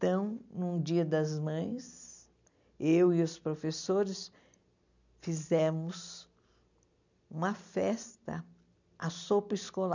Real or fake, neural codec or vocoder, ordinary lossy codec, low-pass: real; none; none; 7.2 kHz